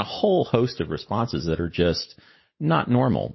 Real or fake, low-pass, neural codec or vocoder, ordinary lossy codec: real; 7.2 kHz; none; MP3, 24 kbps